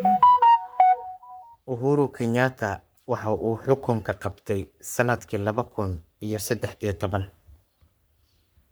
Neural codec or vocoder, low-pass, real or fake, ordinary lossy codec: codec, 44.1 kHz, 3.4 kbps, Pupu-Codec; none; fake; none